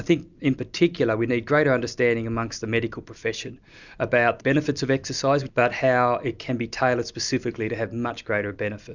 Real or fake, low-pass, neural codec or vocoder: real; 7.2 kHz; none